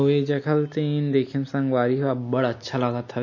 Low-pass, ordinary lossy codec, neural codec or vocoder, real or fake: 7.2 kHz; MP3, 32 kbps; none; real